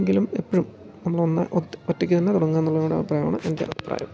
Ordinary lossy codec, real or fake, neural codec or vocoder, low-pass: none; real; none; none